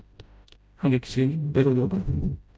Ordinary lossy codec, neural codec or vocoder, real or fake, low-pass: none; codec, 16 kHz, 0.5 kbps, FreqCodec, smaller model; fake; none